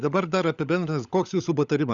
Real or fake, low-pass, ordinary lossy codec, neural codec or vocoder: fake; 7.2 kHz; Opus, 64 kbps; codec, 16 kHz, 16 kbps, FunCodec, trained on LibriTTS, 50 frames a second